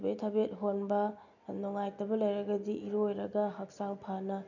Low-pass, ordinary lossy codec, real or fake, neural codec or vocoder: 7.2 kHz; none; real; none